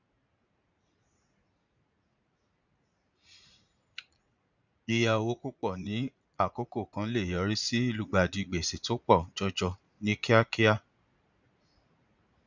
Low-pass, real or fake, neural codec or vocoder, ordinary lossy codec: 7.2 kHz; fake; vocoder, 44.1 kHz, 80 mel bands, Vocos; none